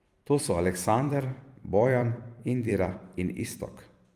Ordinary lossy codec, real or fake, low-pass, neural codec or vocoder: Opus, 32 kbps; fake; 14.4 kHz; vocoder, 44.1 kHz, 128 mel bands every 256 samples, BigVGAN v2